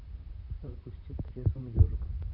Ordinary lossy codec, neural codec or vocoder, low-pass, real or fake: none; vocoder, 44.1 kHz, 128 mel bands, Pupu-Vocoder; 5.4 kHz; fake